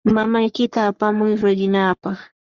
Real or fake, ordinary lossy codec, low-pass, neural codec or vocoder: fake; Opus, 64 kbps; 7.2 kHz; codec, 44.1 kHz, 3.4 kbps, Pupu-Codec